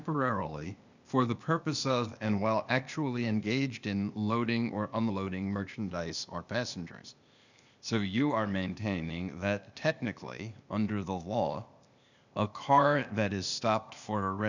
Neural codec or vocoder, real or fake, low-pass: codec, 16 kHz, 0.8 kbps, ZipCodec; fake; 7.2 kHz